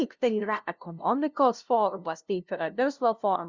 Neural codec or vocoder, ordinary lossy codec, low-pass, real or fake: codec, 16 kHz, 0.5 kbps, FunCodec, trained on LibriTTS, 25 frames a second; Opus, 64 kbps; 7.2 kHz; fake